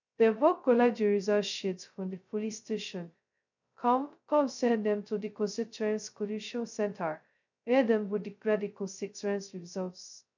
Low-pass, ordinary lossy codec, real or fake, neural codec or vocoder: 7.2 kHz; none; fake; codec, 16 kHz, 0.2 kbps, FocalCodec